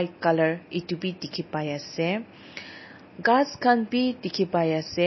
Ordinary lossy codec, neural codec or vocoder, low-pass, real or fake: MP3, 24 kbps; none; 7.2 kHz; real